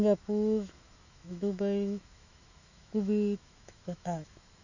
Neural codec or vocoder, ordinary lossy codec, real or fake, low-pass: autoencoder, 48 kHz, 128 numbers a frame, DAC-VAE, trained on Japanese speech; none; fake; 7.2 kHz